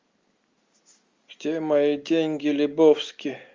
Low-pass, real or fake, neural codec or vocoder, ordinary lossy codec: 7.2 kHz; real; none; Opus, 32 kbps